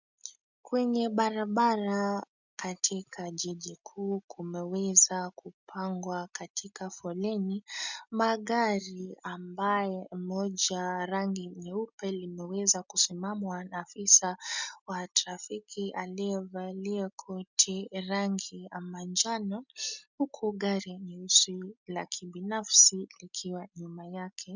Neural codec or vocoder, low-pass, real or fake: none; 7.2 kHz; real